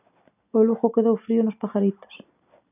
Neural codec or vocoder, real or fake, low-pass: none; real; 3.6 kHz